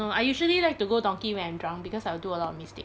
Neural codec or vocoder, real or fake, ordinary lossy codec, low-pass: none; real; none; none